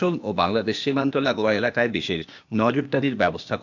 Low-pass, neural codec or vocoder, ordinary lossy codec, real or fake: 7.2 kHz; codec, 16 kHz, 0.8 kbps, ZipCodec; none; fake